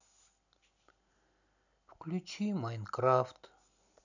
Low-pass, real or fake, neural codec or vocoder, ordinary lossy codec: 7.2 kHz; real; none; none